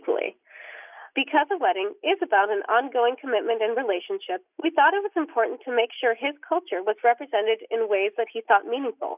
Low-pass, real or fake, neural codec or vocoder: 3.6 kHz; real; none